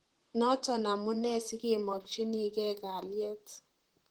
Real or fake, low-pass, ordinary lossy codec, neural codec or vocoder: fake; 19.8 kHz; Opus, 16 kbps; vocoder, 44.1 kHz, 128 mel bands, Pupu-Vocoder